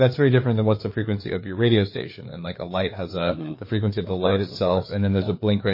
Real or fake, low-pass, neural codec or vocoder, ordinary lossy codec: fake; 5.4 kHz; codec, 16 kHz, 4 kbps, FreqCodec, larger model; MP3, 24 kbps